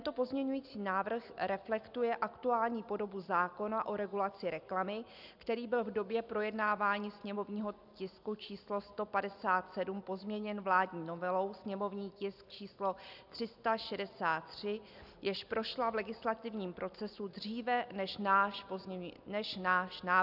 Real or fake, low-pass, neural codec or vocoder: real; 5.4 kHz; none